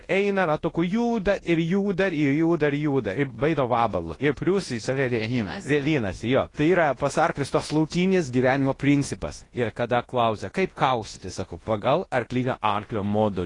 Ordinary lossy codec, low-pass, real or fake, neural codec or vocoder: AAC, 32 kbps; 10.8 kHz; fake; codec, 24 kHz, 0.9 kbps, WavTokenizer, large speech release